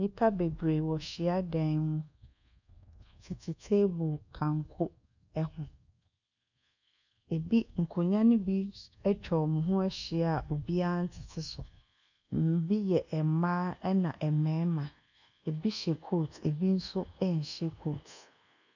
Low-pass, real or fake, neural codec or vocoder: 7.2 kHz; fake; codec, 24 kHz, 1.2 kbps, DualCodec